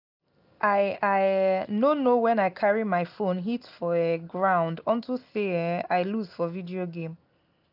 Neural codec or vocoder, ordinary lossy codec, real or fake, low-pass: none; none; real; 5.4 kHz